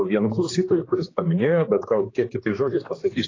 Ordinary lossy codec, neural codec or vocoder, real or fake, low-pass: AAC, 32 kbps; codec, 16 kHz, 2 kbps, X-Codec, HuBERT features, trained on general audio; fake; 7.2 kHz